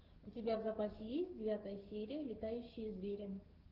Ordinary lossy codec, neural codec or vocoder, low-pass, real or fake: Opus, 16 kbps; codec, 44.1 kHz, 7.8 kbps, DAC; 5.4 kHz; fake